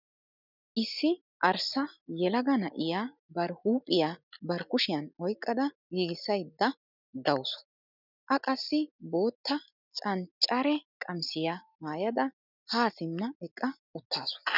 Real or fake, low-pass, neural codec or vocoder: real; 5.4 kHz; none